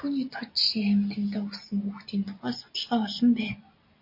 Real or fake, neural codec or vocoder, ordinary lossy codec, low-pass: real; none; MP3, 32 kbps; 5.4 kHz